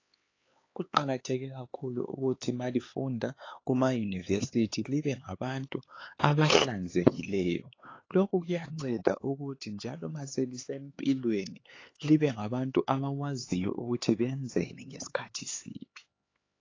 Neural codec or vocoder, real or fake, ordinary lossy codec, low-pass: codec, 16 kHz, 4 kbps, X-Codec, HuBERT features, trained on LibriSpeech; fake; AAC, 32 kbps; 7.2 kHz